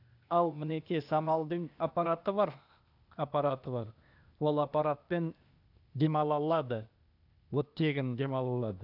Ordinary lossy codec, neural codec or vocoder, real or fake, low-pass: none; codec, 16 kHz, 0.8 kbps, ZipCodec; fake; 5.4 kHz